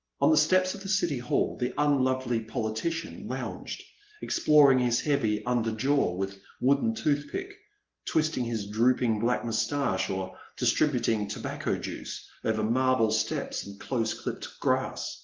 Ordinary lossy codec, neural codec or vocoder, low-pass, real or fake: Opus, 16 kbps; none; 7.2 kHz; real